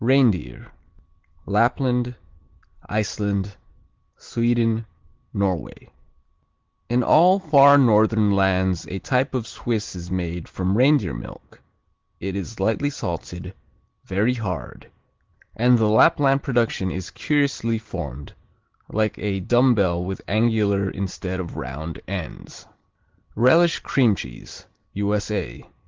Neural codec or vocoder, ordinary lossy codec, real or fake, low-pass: none; Opus, 16 kbps; real; 7.2 kHz